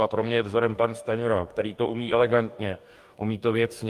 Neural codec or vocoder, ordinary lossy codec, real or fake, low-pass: codec, 44.1 kHz, 2.6 kbps, DAC; Opus, 32 kbps; fake; 14.4 kHz